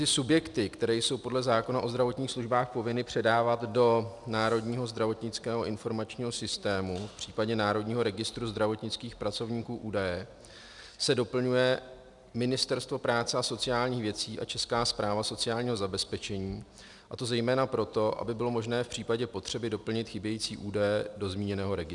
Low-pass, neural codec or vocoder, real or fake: 10.8 kHz; none; real